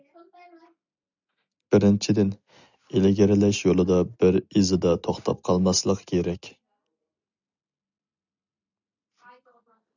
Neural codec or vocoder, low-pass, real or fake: none; 7.2 kHz; real